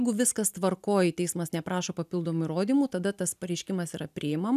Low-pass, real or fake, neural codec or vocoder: 14.4 kHz; real; none